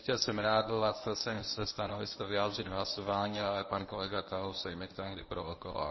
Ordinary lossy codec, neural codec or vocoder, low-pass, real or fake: MP3, 24 kbps; codec, 24 kHz, 0.9 kbps, WavTokenizer, medium speech release version 1; 7.2 kHz; fake